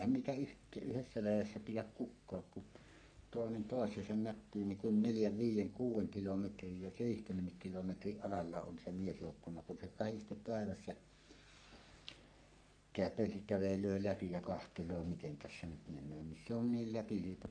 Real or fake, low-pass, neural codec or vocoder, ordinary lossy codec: fake; 9.9 kHz; codec, 44.1 kHz, 3.4 kbps, Pupu-Codec; none